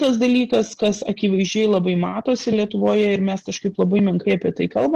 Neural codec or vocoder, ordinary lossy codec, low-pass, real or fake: none; Opus, 16 kbps; 14.4 kHz; real